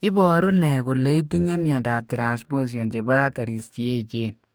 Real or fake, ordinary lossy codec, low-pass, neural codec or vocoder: fake; none; none; codec, 44.1 kHz, 2.6 kbps, DAC